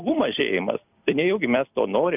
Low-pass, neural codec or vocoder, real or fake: 3.6 kHz; vocoder, 44.1 kHz, 128 mel bands every 256 samples, BigVGAN v2; fake